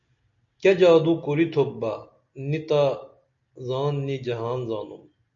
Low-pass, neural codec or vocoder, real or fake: 7.2 kHz; none; real